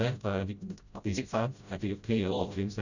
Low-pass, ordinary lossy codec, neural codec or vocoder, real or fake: 7.2 kHz; none; codec, 16 kHz, 0.5 kbps, FreqCodec, smaller model; fake